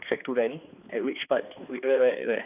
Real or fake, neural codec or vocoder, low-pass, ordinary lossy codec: fake; codec, 16 kHz, 2 kbps, X-Codec, HuBERT features, trained on balanced general audio; 3.6 kHz; none